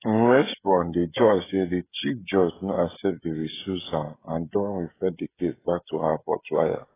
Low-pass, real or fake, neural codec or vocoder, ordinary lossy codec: 3.6 kHz; fake; codec, 16 kHz, 16 kbps, FreqCodec, smaller model; AAC, 16 kbps